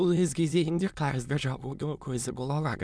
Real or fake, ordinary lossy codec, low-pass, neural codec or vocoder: fake; none; none; autoencoder, 22.05 kHz, a latent of 192 numbers a frame, VITS, trained on many speakers